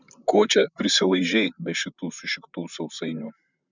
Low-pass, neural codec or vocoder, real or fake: 7.2 kHz; vocoder, 44.1 kHz, 128 mel bands, Pupu-Vocoder; fake